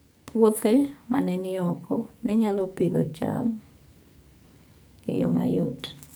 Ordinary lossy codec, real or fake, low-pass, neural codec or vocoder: none; fake; none; codec, 44.1 kHz, 2.6 kbps, SNAC